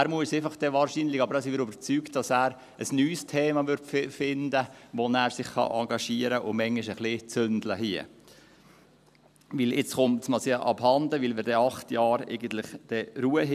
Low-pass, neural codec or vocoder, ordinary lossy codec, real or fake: 14.4 kHz; none; none; real